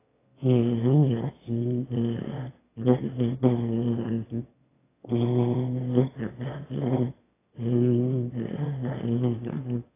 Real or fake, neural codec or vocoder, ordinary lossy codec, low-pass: fake; autoencoder, 22.05 kHz, a latent of 192 numbers a frame, VITS, trained on one speaker; AAC, 16 kbps; 3.6 kHz